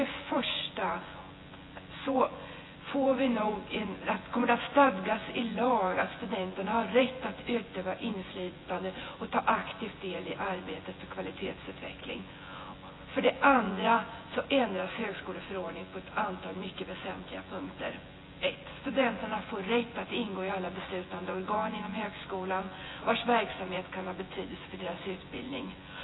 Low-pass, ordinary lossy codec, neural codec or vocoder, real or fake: 7.2 kHz; AAC, 16 kbps; vocoder, 24 kHz, 100 mel bands, Vocos; fake